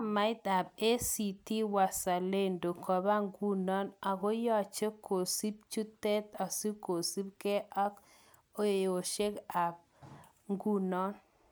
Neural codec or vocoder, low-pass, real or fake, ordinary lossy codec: none; none; real; none